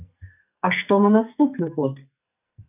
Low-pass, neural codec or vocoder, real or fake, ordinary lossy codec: 3.6 kHz; codec, 44.1 kHz, 2.6 kbps, SNAC; fake; AAC, 32 kbps